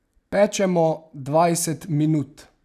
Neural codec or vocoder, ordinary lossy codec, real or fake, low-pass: none; none; real; 14.4 kHz